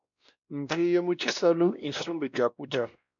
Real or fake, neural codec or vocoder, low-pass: fake; codec, 16 kHz, 1 kbps, X-Codec, WavLM features, trained on Multilingual LibriSpeech; 7.2 kHz